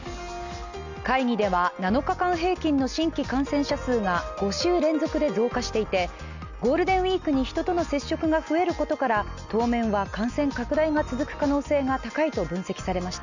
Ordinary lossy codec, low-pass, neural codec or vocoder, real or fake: none; 7.2 kHz; none; real